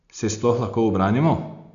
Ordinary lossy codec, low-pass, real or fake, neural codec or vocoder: none; 7.2 kHz; real; none